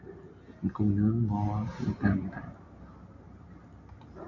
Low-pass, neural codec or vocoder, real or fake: 7.2 kHz; vocoder, 44.1 kHz, 128 mel bands every 256 samples, BigVGAN v2; fake